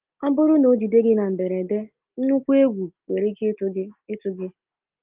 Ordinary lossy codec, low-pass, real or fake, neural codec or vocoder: Opus, 32 kbps; 3.6 kHz; real; none